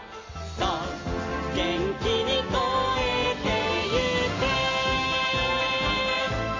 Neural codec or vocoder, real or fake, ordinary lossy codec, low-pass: none; real; MP3, 32 kbps; 7.2 kHz